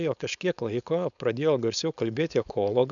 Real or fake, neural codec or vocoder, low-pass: fake; codec, 16 kHz, 4.8 kbps, FACodec; 7.2 kHz